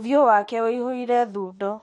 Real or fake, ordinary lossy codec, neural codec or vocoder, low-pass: fake; MP3, 48 kbps; autoencoder, 48 kHz, 32 numbers a frame, DAC-VAE, trained on Japanese speech; 19.8 kHz